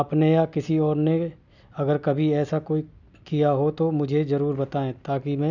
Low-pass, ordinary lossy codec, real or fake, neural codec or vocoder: 7.2 kHz; none; real; none